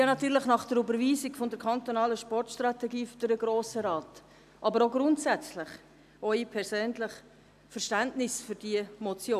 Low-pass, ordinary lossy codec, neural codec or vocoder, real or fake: 14.4 kHz; AAC, 96 kbps; none; real